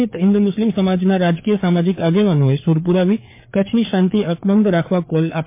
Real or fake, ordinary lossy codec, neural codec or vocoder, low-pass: fake; MP3, 24 kbps; codec, 16 kHz, 4 kbps, FreqCodec, larger model; 3.6 kHz